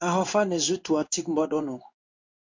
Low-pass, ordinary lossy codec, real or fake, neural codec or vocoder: 7.2 kHz; MP3, 64 kbps; fake; codec, 16 kHz in and 24 kHz out, 1 kbps, XY-Tokenizer